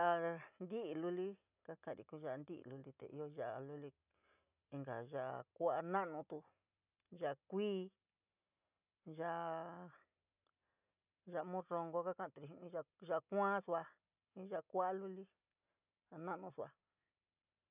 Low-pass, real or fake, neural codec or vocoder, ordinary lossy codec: 3.6 kHz; real; none; none